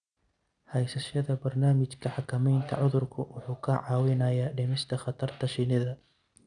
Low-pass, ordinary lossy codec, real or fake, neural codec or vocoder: 9.9 kHz; none; real; none